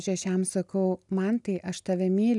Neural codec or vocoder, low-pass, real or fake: none; 10.8 kHz; real